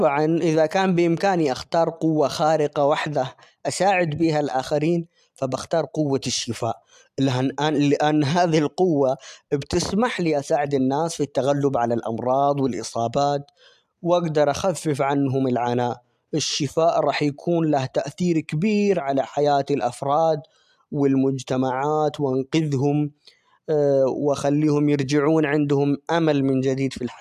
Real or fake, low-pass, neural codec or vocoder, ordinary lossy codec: real; 14.4 kHz; none; AAC, 96 kbps